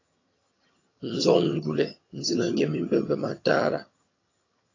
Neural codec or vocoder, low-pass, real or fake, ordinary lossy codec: vocoder, 22.05 kHz, 80 mel bands, HiFi-GAN; 7.2 kHz; fake; AAC, 32 kbps